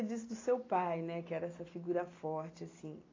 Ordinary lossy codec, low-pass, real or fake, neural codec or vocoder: AAC, 32 kbps; 7.2 kHz; real; none